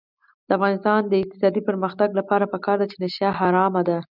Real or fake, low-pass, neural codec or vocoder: real; 5.4 kHz; none